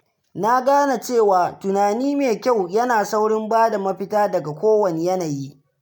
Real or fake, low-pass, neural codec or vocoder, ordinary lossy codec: real; none; none; none